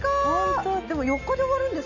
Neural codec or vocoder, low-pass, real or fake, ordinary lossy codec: none; 7.2 kHz; real; AAC, 48 kbps